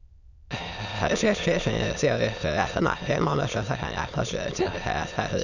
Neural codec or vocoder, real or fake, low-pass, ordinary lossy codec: autoencoder, 22.05 kHz, a latent of 192 numbers a frame, VITS, trained on many speakers; fake; 7.2 kHz; none